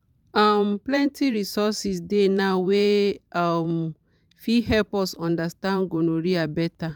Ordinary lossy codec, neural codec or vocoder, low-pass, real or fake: none; vocoder, 44.1 kHz, 128 mel bands every 512 samples, BigVGAN v2; 19.8 kHz; fake